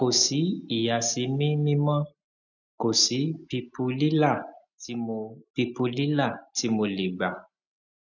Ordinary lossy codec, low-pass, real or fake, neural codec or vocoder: none; 7.2 kHz; real; none